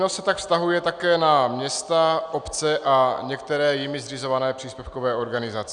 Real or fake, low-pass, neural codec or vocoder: real; 9.9 kHz; none